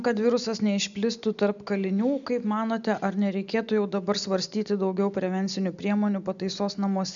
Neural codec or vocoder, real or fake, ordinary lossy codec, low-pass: none; real; MP3, 96 kbps; 7.2 kHz